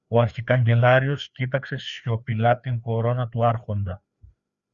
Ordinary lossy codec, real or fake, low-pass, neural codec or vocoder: Opus, 64 kbps; fake; 7.2 kHz; codec, 16 kHz, 2 kbps, FreqCodec, larger model